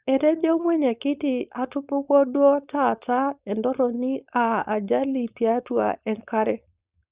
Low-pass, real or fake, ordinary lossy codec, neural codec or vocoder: 3.6 kHz; fake; Opus, 64 kbps; codec, 16 kHz, 4.8 kbps, FACodec